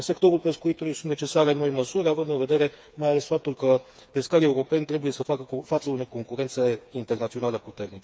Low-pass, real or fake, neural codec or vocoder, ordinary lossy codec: none; fake; codec, 16 kHz, 4 kbps, FreqCodec, smaller model; none